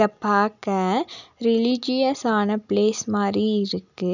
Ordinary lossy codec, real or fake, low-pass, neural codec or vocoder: none; real; 7.2 kHz; none